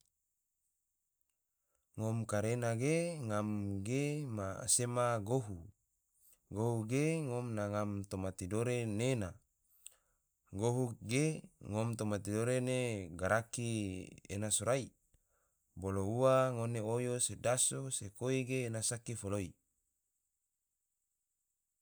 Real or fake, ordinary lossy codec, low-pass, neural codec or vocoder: real; none; none; none